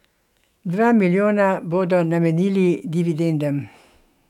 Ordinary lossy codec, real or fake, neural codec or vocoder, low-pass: none; fake; autoencoder, 48 kHz, 128 numbers a frame, DAC-VAE, trained on Japanese speech; 19.8 kHz